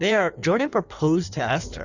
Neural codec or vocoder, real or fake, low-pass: codec, 16 kHz in and 24 kHz out, 1.1 kbps, FireRedTTS-2 codec; fake; 7.2 kHz